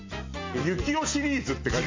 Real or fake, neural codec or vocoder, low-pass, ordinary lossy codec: real; none; 7.2 kHz; MP3, 64 kbps